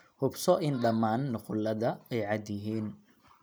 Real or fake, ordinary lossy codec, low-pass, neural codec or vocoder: real; none; none; none